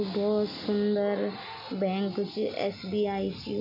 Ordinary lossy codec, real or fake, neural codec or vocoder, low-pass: MP3, 32 kbps; real; none; 5.4 kHz